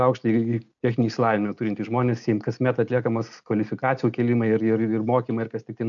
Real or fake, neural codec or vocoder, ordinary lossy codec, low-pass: real; none; MP3, 96 kbps; 7.2 kHz